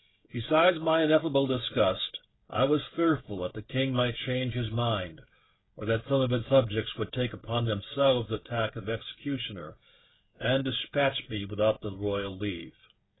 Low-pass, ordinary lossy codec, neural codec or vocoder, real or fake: 7.2 kHz; AAC, 16 kbps; codec, 16 kHz, 16 kbps, FreqCodec, smaller model; fake